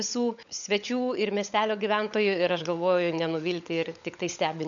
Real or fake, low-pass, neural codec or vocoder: fake; 7.2 kHz; codec, 16 kHz, 16 kbps, FunCodec, trained on LibriTTS, 50 frames a second